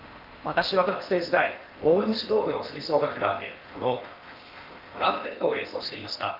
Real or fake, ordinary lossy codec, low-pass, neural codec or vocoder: fake; Opus, 32 kbps; 5.4 kHz; codec, 16 kHz in and 24 kHz out, 0.8 kbps, FocalCodec, streaming, 65536 codes